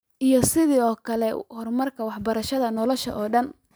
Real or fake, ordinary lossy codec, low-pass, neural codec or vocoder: fake; none; none; vocoder, 44.1 kHz, 128 mel bands every 512 samples, BigVGAN v2